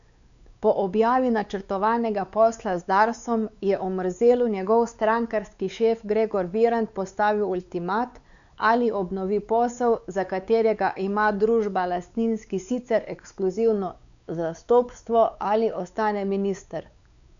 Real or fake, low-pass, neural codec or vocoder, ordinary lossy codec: fake; 7.2 kHz; codec, 16 kHz, 4 kbps, X-Codec, WavLM features, trained on Multilingual LibriSpeech; MP3, 96 kbps